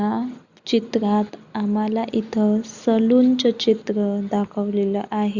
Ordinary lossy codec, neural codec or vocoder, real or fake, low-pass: Opus, 64 kbps; none; real; 7.2 kHz